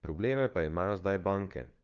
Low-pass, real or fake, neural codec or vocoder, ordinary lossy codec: 7.2 kHz; fake; codec, 16 kHz, 2 kbps, FunCodec, trained on LibriTTS, 25 frames a second; Opus, 24 kbps